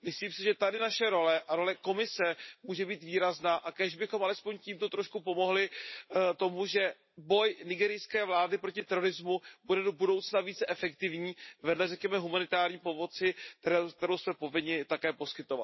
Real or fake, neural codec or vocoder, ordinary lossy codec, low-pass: real; none; MP3, 24 kbps; 7.2 kHz